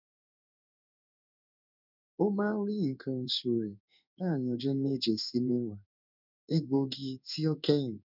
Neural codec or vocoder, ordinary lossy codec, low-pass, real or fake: codec, 16 kHz in and 24 kHz out, 1 kbps, XY-Tokenizer; none; 5.4 kHz; fake